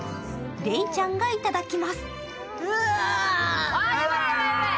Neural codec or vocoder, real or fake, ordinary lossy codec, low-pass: none; real; none; none